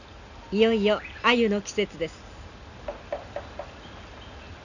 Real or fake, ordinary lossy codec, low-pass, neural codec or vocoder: real; none; 7.2 kHz; none